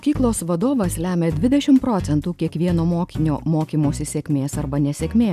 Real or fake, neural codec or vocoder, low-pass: real; none; 14.4 kHz